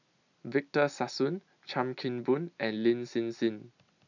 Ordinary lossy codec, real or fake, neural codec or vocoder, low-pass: none; real; none; 7.2 kHz